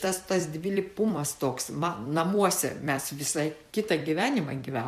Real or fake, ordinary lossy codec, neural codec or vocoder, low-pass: fake; MP3, 96 kbps; vocoder, 44.1 kHz, 128 mel bands every 256 samples, BigVGAN v2; 14.4 kHz